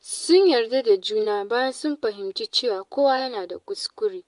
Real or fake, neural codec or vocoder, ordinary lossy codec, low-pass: fake; vocoder, 24 kHz, 100 mel bands, Vocos; AAC, 64 kbps; 10.8 kHz